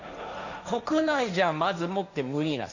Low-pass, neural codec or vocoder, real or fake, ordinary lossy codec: 7.2 kHz; codec, 16 kHz, 1.1 kbps, Voila-Tokenizer; fake; none